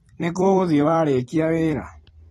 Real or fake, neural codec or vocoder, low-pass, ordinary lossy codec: fake; vocoder, 44.1 kHz, 128 mel bands every 256 samples, BigVGAN v2; 19.8 kHz; AAC, 32 kbps